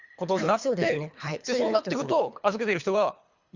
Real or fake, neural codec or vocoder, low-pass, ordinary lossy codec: fake; codec, 24 kHz, 3 kbps, HILCodec; 7.2 kHz; Opus, 64 kbps